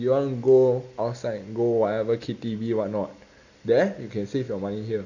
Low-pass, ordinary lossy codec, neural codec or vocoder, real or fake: 7.2 kHz; none; none; real